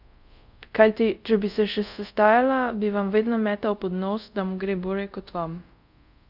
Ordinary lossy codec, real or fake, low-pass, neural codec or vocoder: none; fake; 5.4 kHz; codec, 24 kHz, 0.5 kbps, DualCodec